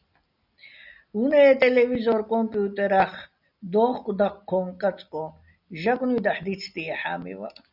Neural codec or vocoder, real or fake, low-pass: none; real; 5.4 kHz